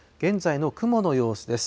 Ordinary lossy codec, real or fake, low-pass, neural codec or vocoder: none; real; none; none